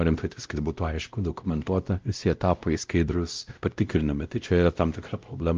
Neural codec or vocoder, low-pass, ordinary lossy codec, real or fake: codec, 16 kHz, 0.5 kbps, X-Codec, WavLM features, trained on Multilingual LibriSpeech; 7.2 kHz; Opus, 24 kbps; fake